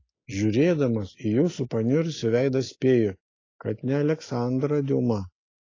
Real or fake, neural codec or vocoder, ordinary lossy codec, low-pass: real; none; AAC, 32 kbps; 7.2 kHz